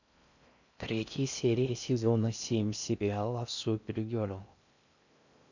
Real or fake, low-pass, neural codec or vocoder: fake; 7.2 kHz; codec, 16 kHz in and 24 kHz out, 0.6 kbps, FocalCodec, streaming, 4096 codes